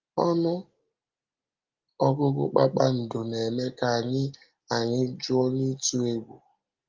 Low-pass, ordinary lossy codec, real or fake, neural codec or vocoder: 7.2 kHz; Opus, 32 kbps; real; none